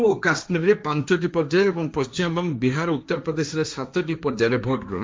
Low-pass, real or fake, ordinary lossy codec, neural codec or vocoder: none; fake; none; codec, 16 kHz, 1.1 kbps, Voila-Tokenizer